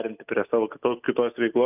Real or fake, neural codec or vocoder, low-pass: real; none; 3.6 kHz